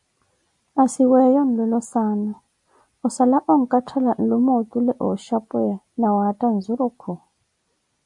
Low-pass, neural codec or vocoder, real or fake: 10.8 kHz; none; real